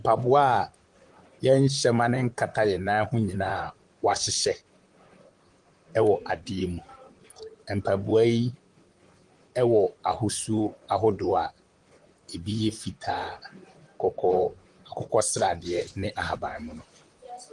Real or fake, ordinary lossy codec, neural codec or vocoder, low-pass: fake; Opus, 24 kbps; vocoder, 44.1 kHz, 128 mel bands, Pupu-Vocoder; 10.8 kHz